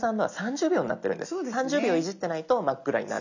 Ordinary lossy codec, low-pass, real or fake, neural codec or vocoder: none; 7.2 kHz; real; none